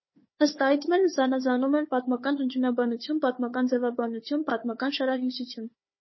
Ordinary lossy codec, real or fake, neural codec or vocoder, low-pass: MP3, 24 kbps; fake; codec, 16 kHz, 4 kbps, FunCodec, trained on Chinese and English, 50 frames a second; 7.2 kHz